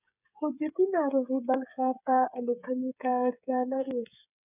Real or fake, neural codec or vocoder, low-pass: fake; codec, 16 kHz, 8 kbps, FreqCodec, smaller model; 3.6 kHz